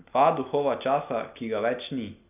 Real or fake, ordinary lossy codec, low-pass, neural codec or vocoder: real; none; 3.6 kHz; none